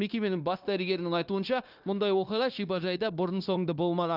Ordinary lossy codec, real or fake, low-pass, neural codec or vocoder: Opus, 24 kbps; fake; 5.4 kHz; codec, 16 kHz, 0.9 kbps, LongCat-Audio-Codec